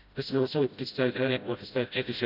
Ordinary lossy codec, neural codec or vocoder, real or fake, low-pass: none; codec, 16 kHz, 0.5 kbps, FreqCodec, smaller model; fake; 5.4 kHz